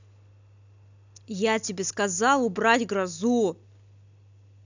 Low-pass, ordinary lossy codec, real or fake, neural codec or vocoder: 7.2 kHz; none; real; none